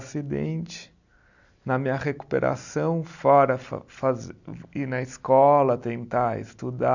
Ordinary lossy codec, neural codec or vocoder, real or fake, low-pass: none; none; real; 7.2 kHz